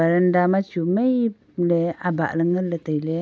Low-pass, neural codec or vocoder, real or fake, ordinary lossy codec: none; none; real; none